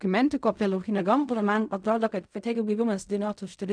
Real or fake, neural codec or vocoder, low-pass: fake; codec, 16 kHz in and 24 kHz out, 0.4 kbps, LongCat-Audio-Codec, fine tuned four codebook decoder; 9.9 kHz